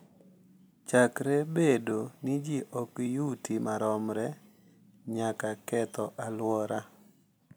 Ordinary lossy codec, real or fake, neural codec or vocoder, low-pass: none; real; none; none